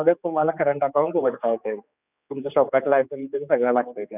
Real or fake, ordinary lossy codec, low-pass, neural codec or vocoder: fake; none; 3.6 kHz; codec, 16 kHz, 4 kbps, X-Codec, HuBERT features, trained on general audio